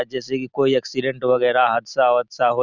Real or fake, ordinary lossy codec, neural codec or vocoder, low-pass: real; none; none; 7.2 kHz